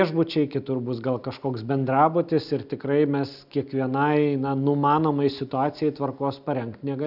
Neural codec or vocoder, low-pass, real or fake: none; 5.4 kHz; real